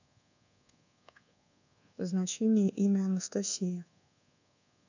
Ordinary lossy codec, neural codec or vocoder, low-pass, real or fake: none; codec, 24 kHz, 1.2 kbps, DualCodec; 7.2 kHz; fake